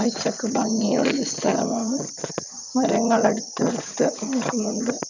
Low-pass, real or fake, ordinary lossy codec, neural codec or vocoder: 7.2 kHz; fake; none; vocoder, 22.05 kHz, 80 mel bands, HiFi-GAN